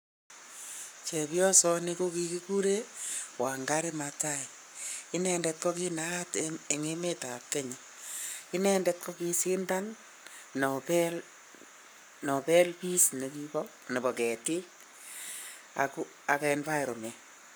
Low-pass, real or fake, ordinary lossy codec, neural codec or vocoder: none; fake; none; codec, 44.1 kHz, 7.8 kbps, Pupu-Codec